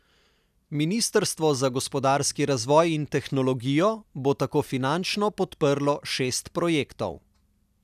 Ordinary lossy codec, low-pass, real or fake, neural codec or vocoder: none; 14.4 kHz; real; none